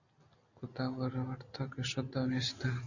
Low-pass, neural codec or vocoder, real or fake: 7.2 kHz; none; real